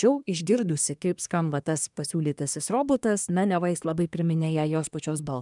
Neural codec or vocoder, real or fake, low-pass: codec, 24 kHz, 1 kbps, SNAC; fake; 10.8 kHz